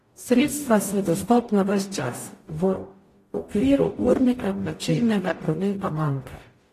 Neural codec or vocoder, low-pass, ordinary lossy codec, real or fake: codec, 44.1 kHz, 0.9 kbps, DAC; 14.4 kHz; AAC, 48 kbps; fake